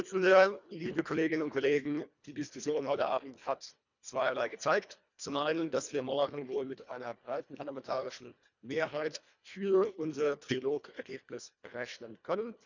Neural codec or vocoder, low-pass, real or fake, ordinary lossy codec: codec, 24 kHz, 1.5 kbps, HILCodec; 7.2 kHz; fake; none